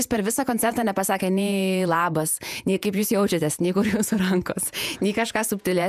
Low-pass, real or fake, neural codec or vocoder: 14.4 kHz; fake; vocoder, 48 kHz, 128 mel bands, Vocos